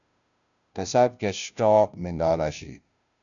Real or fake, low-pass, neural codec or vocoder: fake; 7.2 kHz; codec, 16 kHz, 0.5 kbps, FunCodec, trained on Chinese and English, 25 frames a second